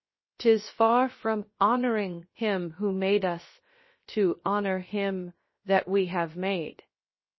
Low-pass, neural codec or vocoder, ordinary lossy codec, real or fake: 7.2 kHz; codec, 16 kHz, 0.7 kbps, FocalCodec; MP3, 24 kbps; fake